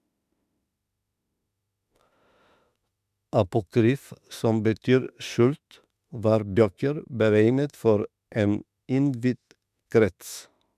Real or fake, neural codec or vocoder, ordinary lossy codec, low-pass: fake; autoencoder, 48 kHz, 32 numbers a frame, DAC-VAE, trained on Japanese speech; none; 14.4 kHz